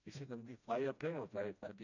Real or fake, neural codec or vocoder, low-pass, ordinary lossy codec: fake; codec, 16 kHz, 1 kbps, FreqCodec, smaller model; 7.2 kHz; none